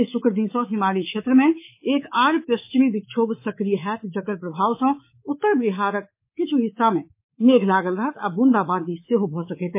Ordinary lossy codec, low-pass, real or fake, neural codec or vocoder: MP3, 24 kbps; 3.6 kHz; fake; codec, 24 kHz, 3.1 kbps, DualCodec